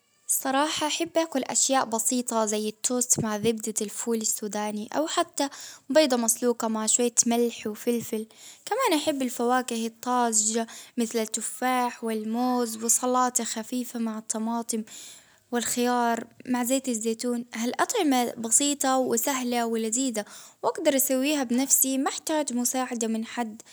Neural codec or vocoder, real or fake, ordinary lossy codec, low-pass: none; real; none; none